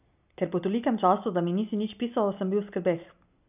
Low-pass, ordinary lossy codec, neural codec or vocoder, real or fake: 3.6 kHz; none; none; real